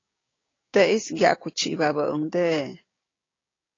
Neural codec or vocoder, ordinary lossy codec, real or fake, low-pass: codec, 16 kHz, 6 kbps, DAC; AAC, 32 kbps; fake; 7.2 kHz